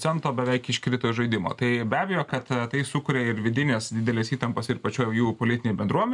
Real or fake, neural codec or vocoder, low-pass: real; none; 10.8 kHz